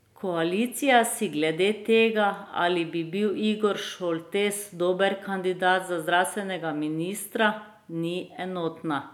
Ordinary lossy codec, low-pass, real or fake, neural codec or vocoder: none; 19.8 kHz; real; none